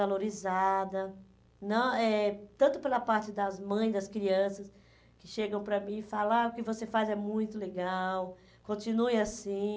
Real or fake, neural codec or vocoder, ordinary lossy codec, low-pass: real; none; none; none